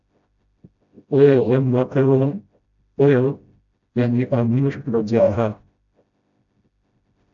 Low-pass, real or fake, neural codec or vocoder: 7.2 kHz; fake; codec, 16 kHz, 0.5 kbps, FreqCodec, smaller model